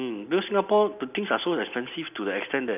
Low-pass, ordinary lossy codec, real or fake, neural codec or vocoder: 3.6 kHz; none; real; none